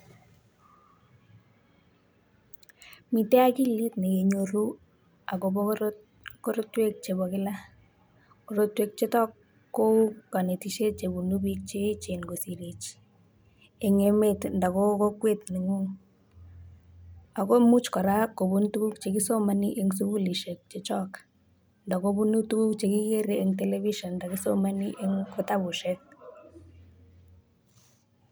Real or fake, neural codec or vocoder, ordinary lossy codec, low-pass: real; none; none; none